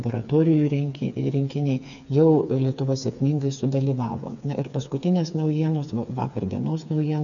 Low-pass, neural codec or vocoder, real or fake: 7.2 kHz; codec, 16 kHz, 4 kbps, FreqCodec, smaller model; fake